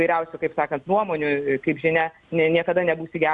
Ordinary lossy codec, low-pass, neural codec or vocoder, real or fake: Opus, 64 kbps; 10.8 kHz; none; real